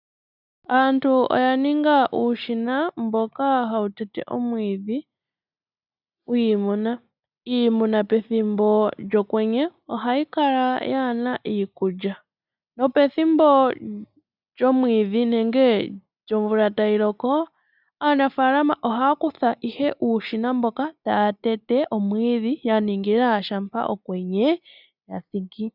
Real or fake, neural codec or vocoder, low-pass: real; none; 5.4 kHz